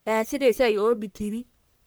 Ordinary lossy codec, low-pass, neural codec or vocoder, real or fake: none; none; codec, 44.1 kHz, 1.7 kbps, Pupu-Codec; fake